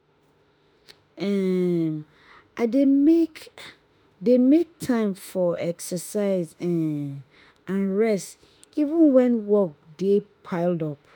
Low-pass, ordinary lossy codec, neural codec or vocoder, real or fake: none; none; autoencoder, 48 kHz, 32 numbers a frame, DAC-VAE, trained on Japanese speech; fake